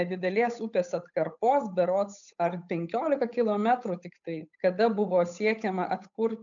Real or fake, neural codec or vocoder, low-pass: fake; codec, 16 kHz, 8 kbps, FunCodec, trained on Chinese and English, 25 frames a second; 7.2 kHz